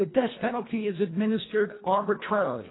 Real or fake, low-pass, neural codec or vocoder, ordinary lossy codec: fake; 7.2 kHz; codec, 24 kHz, 1.5 kbps, HILCodec; AAC, 16 kbps